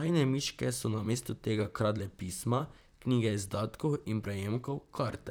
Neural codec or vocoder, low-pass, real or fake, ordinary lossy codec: vocoder, 44.1 kHz, 128 mel bands, Pupu-Vocoder; none; fake; none